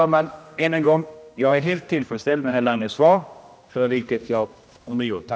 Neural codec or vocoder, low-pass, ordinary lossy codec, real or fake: codec, 16 kHz, 1 kbps, X-Codec, HuBERT features, trained on general audio; none; none; fake